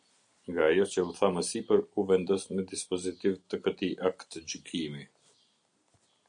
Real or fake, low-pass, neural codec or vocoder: real; 9.9 kHz; none